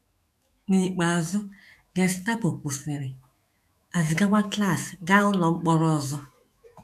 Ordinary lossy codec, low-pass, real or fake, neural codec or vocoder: none; 14.4 kHz; fake; autoencoder, 48 kHz, 128 numbers a frame, DAC-VAE, trained on Japanese speech